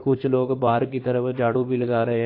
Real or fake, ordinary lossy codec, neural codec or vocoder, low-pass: fake; AAC, 32 kbps; codec, 16 kHz in and 24 kHz out, 2.2 kbps, FireRedTTS-2 codec; 5.4 kHz